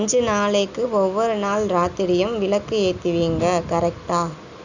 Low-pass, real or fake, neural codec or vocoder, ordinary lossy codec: 7.2 kHz; real; none; none